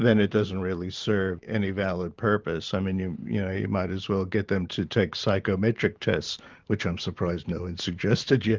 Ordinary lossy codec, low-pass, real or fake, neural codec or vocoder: Opus, 24 kbps; 7.2 kHz; fake; vocoder, 44.1 kHz, 128 mel bands every 512 samples, BigVGAN v2